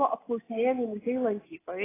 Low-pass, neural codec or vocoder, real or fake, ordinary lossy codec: 3.6 kHz; none; real; AAC, 16 kbps